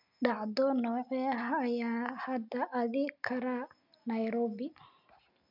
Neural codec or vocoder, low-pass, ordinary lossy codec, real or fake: none; 5.4 kHz; none; real